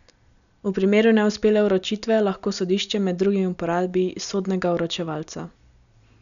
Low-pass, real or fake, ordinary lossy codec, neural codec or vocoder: 7.2 kHz; real; none; none